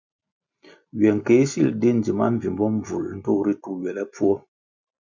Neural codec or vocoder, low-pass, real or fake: none; 7.2 kHz; real